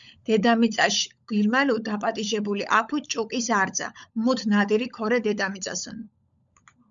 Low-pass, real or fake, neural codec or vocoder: 7.2 kHz; fake; codec, 16 kHz, 16 kbps, FunCodec, trained on LibriTTS, 50 frames a second